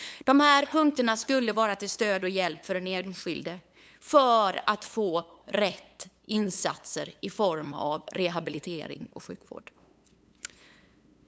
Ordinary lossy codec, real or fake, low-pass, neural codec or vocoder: none; fake; none; codec, 16 kHz, 8 kbps, FunCodec, trained on LibriTTS, 25 frames a second